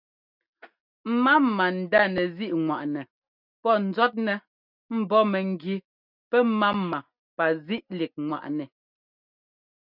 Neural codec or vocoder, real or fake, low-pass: none; real; 5.4 kHz